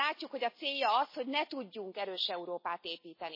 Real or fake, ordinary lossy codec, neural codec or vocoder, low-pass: real; MP3, 24 kbps; none; 5.4 kHz